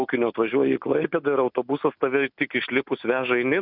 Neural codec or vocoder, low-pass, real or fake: none; 5.4 kHz; real